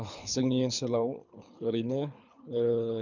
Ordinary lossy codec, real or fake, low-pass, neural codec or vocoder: none; fake; 7.2 kHz; codec, 24 kHz, 3 kbps, HILCodec